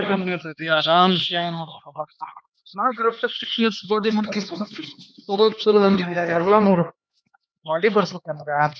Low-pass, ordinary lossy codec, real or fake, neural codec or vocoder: none; none; fake; codec, 16 kHz, 2 kbps, X-Codec, HuBERT features, trained on LibriSpeech